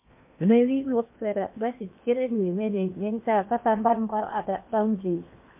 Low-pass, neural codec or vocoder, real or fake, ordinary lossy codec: 3.6 kHz; codec, 16 kHz in and 24 kHz out, 0.8 kbps, FocalCodec, streaming, 65536 codes; fake; MP3, 32 kbps